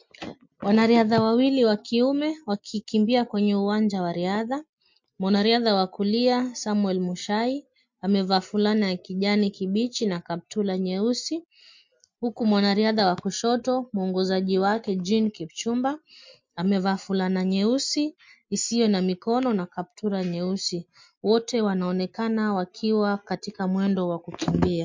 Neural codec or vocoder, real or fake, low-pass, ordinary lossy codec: none; real; 7.2 kHz; MP3, 48 kbps